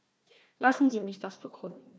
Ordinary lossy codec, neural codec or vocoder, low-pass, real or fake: none; codec, 16 kHz, 1 kbps, FunCodec, trained on Chinese and English, 50 frames a second; none; fake